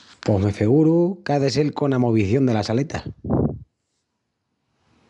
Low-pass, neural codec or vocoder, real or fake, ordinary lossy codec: 10.8 kHz; none; real; MP3, 96 kbps